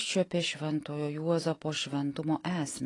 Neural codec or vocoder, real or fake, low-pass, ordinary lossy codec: none; real; 10.8 kHz; AAC, 32 kbps